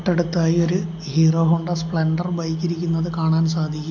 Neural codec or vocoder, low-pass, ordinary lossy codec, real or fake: none; 7.2 kHz; MP3, 64 kbps; real